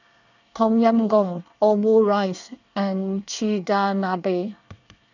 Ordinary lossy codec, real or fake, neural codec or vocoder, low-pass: none; fake; codec, 24 kHz, 1 kbps, SNAC; 7.2 kHz